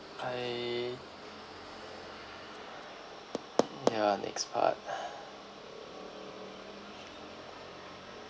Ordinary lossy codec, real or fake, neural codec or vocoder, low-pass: none; real; none; none